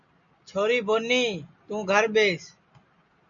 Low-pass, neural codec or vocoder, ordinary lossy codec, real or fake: 7.2 kHz; none; MP3, 96 kbps; real